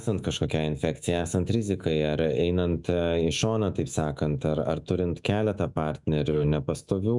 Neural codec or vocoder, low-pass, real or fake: autoencoder, 48 kHz, 128 numbers a frame, DAC-VAE, trained on Japanese speech; 10.8 kHz; fake